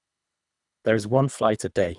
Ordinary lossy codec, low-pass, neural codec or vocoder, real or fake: none; 10.8 kHz; codec, 24 kHz, 3 kbps, HILCodec; fake